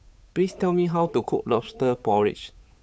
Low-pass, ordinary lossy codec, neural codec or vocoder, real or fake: none; none; codec, 16 kHz, 8 kbps, FunCodec, trained on Chinese and English, 25 frames a second; fake